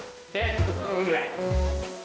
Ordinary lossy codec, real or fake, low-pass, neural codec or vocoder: none; fake; none; codec, 16 kHz, 1 kbps, X-Codec, HuBERT features, trained on balanced general audio